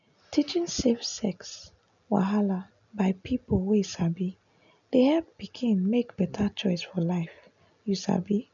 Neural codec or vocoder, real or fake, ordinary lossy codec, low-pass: none; real; none; 7.2 kHz